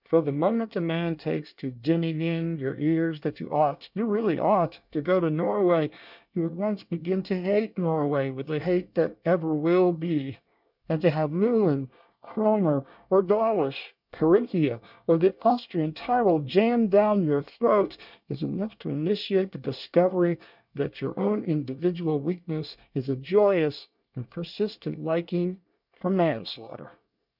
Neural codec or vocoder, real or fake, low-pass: codec, 24 kHz, 1 kbps, SNAC; fake; 5.4 kHz